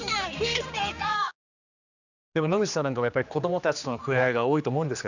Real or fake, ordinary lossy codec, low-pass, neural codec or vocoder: fake; none; 7.2 kHz; codec, 16 kHz, 2 kbps, X-Codec, HuBERT features, trained on general audio